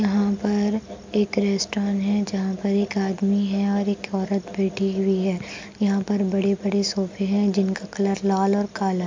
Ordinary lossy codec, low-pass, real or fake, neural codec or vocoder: MP3, 64 kbps; 7.2 kHz; real; none